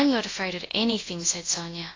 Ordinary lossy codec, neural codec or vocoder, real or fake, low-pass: AAC, 32 kbps; codec, 24 kHz, 0.9 kbps, WavTokenizer, large speech release; fake; 7.2 kHz